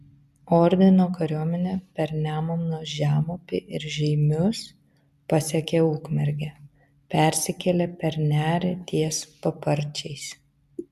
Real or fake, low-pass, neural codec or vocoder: real; 14.4 kHz; none